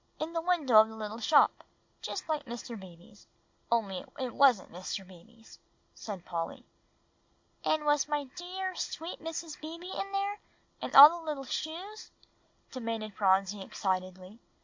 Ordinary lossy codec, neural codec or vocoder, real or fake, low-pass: MP3, 48 kbps; none; real; 7.2 kHz